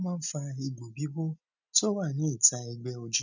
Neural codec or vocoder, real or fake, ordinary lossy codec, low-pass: none; real; none; 7.2 kHz